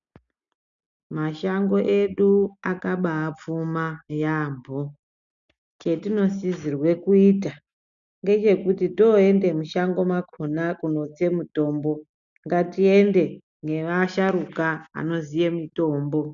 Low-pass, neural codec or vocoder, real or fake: 7.2 kHz; none; real